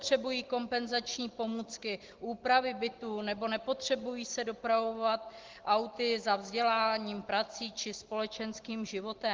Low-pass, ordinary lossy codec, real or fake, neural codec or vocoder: 7.2 kHz; Opus, 16 kbps; real; none